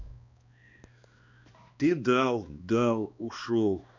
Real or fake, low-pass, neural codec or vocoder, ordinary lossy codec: fake; 7.2 kHz; codec, 16 kHz, 2 kbps, X-Codec, HuBERT features, trained on balanced general audio; none